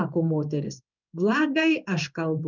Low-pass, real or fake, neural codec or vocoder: 7.2 kHz; fake; codec, 16 kHz in and 24 kHz out, 1 kbps, XY-Tokenizer